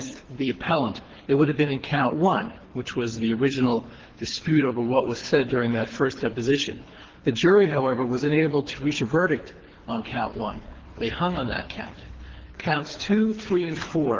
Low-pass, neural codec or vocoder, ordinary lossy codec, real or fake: 7.2 kHz; codec, 24 kHz, 3 kbps, HILCodec; Opus, 16 kbps; fake